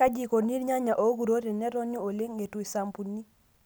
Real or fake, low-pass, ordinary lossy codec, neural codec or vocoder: real; none; none; none